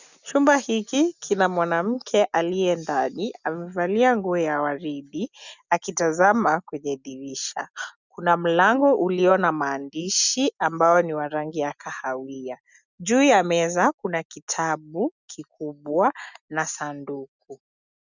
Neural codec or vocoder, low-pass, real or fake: none; 7.2 kHz; real